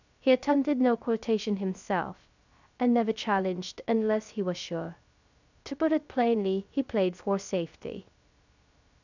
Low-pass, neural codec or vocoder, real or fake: 7.2 kHz; codec, 16 kHz, 0.3 kbps, FocalCodec; fake